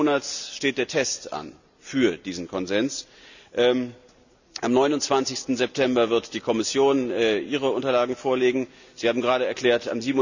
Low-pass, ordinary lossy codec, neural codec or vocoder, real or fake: 7.2 kHz; none; none; real